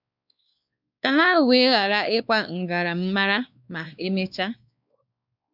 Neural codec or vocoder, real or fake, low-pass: codec, 16 kHz, 2 kbps, X-Codec, WavLM features, trained on Multilingual LibriSpeech; fake; 5.4 kHz